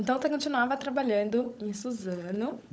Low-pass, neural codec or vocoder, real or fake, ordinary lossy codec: none; codec, 16 kHz, 16 kbps, FunCodec, trained on LibriTTS, 50 frames a second; fake; none